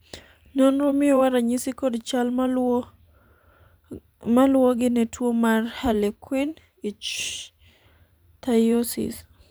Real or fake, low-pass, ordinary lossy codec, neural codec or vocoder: fake; none; none; vocoder, 44.1 kHz, 128 mel bands every 512 samples, BigVGAN v2